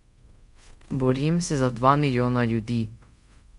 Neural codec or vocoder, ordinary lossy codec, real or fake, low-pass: codec, 24 kHz, 0.5 kbps, DualCodec; MP3, 64 kbps; fake; 10.8 kHz